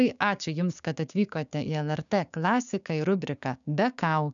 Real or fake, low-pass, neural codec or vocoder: fake; 7.2 kHz; codec, 16 kHz, 6 kbps, DAC